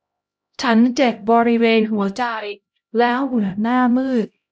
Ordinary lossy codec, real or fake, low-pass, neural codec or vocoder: none; fake; none; codec, 16 kHz, 0.5 kbps, X-Codec, HuBERT features, trained on LibriSpeech